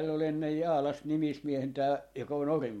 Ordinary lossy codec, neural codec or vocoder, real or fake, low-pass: MP3, 64 kbps; none; real; 19.8 kHz